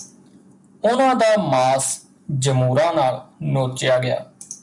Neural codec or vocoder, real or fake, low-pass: none; real; 10.8 kHz